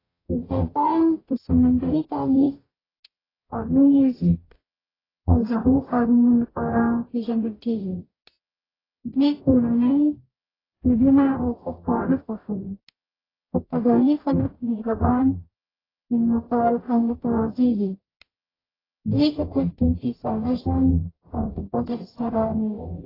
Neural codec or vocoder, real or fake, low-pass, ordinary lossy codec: codec, 44.1 kHz, 0.9 kbps, DAC; fake; 5.4 kHz; AAC, 24 kbps